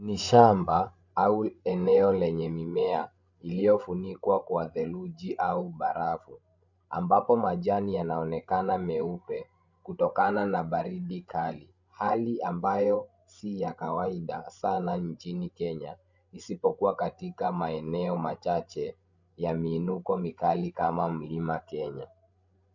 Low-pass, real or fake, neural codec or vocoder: 7.2 kHz; fake; codec, 16 kHz, 16 kbps, FreqCodec, larger model